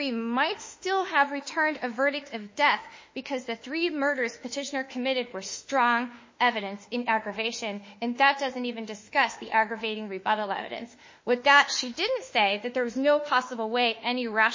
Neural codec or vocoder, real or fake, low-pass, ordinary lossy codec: autoencoder, 48 kHz, 32 numbers a frame, DAC-VAE, trained on Japanese speech; fake; 7.2 kHz; MP3, 32 kbps